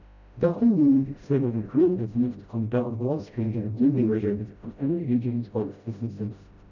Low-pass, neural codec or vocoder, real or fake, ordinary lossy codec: 7.2 kHz; codec, 16 kHz, 0.5 kbps, FreqCodec, smaller model; fake; none